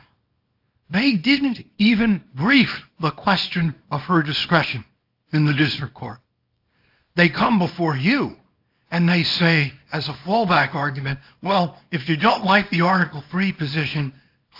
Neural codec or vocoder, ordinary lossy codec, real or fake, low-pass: codec, 24 kHz, 0.9 kbps, WavTokenizer, small release; AAC, 48 kbps; fake; 5.4 kHz